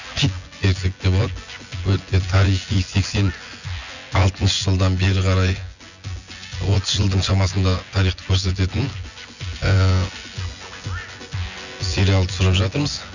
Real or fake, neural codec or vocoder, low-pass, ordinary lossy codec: fake; vocoder, 24 kHz, 100 mel bands, Vocos; 7.2 kHz; none